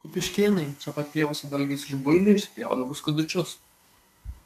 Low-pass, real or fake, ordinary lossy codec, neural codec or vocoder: 14.4 kHz; fake; MP3, 96 kbps; codec, 32 kHz, 1.9 kbps, SNAC